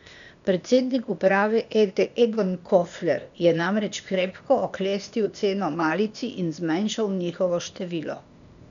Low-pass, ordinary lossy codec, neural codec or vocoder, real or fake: 7.2 kHz; none; codec, 16 kHz, 0.8 kbps, ZipCodec; fake